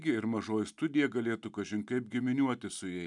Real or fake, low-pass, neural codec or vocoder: real; 10.8 kHz; none